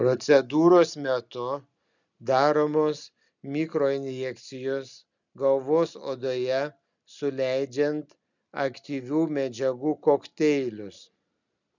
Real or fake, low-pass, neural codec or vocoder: real; 7.2 kHz; none